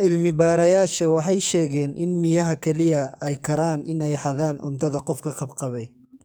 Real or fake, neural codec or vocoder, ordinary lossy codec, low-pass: fake; codec, 44.1 kHz, 2.6 kbps, SNAC; none; none